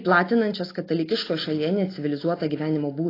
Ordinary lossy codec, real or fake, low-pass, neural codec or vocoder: AAC, 24 kbps; real; 5.4 kHz; none